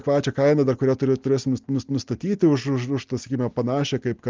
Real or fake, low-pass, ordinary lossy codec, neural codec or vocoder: real; 7.2 kHz; Opus, 32 kbps; none